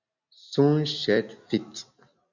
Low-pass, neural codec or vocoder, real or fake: 7.2 kHz; none; real